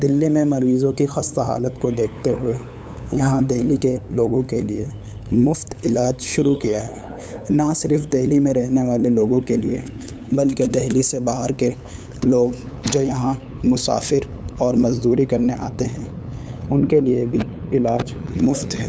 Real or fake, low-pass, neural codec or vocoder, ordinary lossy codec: fake; none; codec, 16 kHz, 8 kbps, FunCodec, trained on LibriTTS, 25 frames a second; none